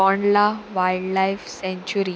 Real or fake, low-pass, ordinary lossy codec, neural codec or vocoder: real; none; none; none